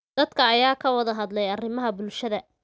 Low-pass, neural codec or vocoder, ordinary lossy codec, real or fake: none; none; none; real